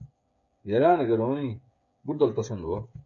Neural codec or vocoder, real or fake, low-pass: codec, 16 kHz, 8 kbps, FreqCodec, smaller model; fake; 7.2 kHz